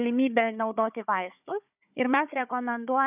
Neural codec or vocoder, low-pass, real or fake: codec, 16 kHz, 4 kbps, FunCodec, trained on Chinese and English, 50 frames a second; 3.6 kHz; fake